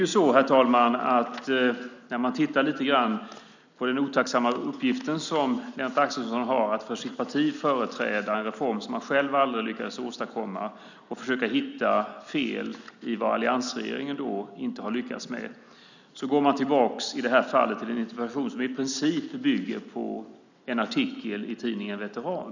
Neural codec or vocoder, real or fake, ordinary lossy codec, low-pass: none; real; none; 7.2 kHz